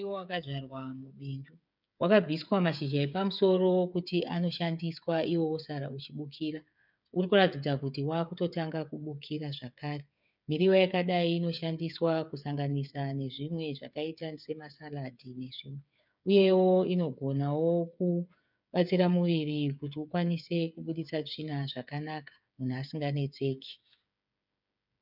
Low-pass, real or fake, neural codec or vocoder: 5.4 kHz; fake; codec, 16 kHz, 8 kbps, FreqCodec, smaller model